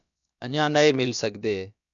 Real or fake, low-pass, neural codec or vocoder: fake; 7.2 kHz; codec, 16 kHz, about 1 kbps, DyCAST, with the encoder's durations